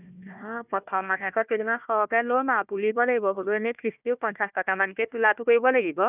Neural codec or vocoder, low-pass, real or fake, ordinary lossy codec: codec, 16 kHz, 1 kbps, FunCodec, trained on Chinese and English, 50 frames a second; 3.6 kHz; fake; none